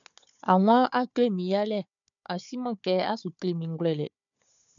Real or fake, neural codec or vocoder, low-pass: fake; codec, 16 kHz, 4 kbps, FunCodec, trained on Chinese and English, 50 frames a second; 7.2 kHz